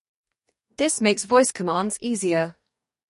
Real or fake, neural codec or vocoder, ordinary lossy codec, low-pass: fake; codec, 44.1 kHz, 2.6 kbps, DAC; MP3, 48 kbps; 14.4 kHz